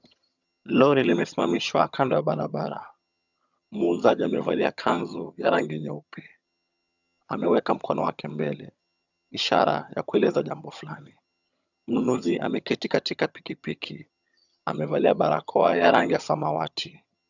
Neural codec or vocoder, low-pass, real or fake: vocoder, 22.05 kHz, 80 mel bands, HiFi-GAN; 7.2 kHz; fake